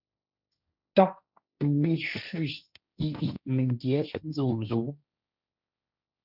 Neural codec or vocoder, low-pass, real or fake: codec, 16 kHz, 1.1 kbps, Voila-Tokenizer; 5.4 kHz; fake